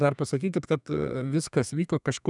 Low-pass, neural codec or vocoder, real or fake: 10.8 kHz; codec, 32 kHz, 1.9 kbps, SNAC; fake